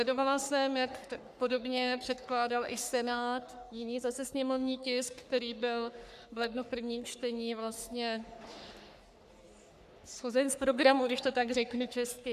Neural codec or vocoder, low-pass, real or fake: codec, 44.1 kHz, 3.4 kbps, Pupu-Codec; 14.4 kHz; fake